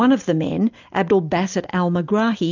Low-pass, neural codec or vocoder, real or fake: 7.2 kHz; none; real